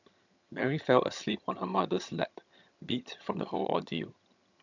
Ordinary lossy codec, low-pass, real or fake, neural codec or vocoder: none; 7.2 kHz; fake; vocoder, 22.05 kHz, 80 mel bands, HiFi-GAN